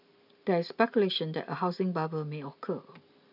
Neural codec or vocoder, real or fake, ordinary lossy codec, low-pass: none; real; none; 5.4 kHz